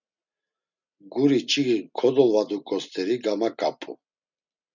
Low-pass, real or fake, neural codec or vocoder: 7.2 kHz; real; none